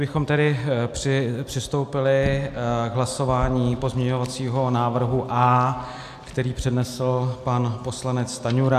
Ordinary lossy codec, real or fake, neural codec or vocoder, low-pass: AAC, 96 kbps; real; none; 14.4 kHz